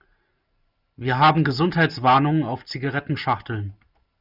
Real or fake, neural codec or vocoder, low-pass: real; none; 5.4 kHz